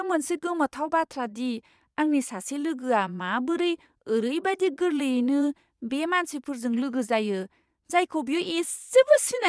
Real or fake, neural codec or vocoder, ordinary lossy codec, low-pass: fake; vocoder, 22.05 kHz, 80 mel bands, Vocos; none; none